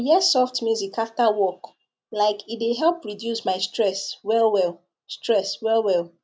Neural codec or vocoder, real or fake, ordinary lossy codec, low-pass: none; real; none; none